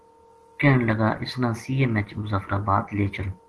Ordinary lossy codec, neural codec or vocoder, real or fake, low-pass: Opus, 16 kbps; none; real; 10.8 kHz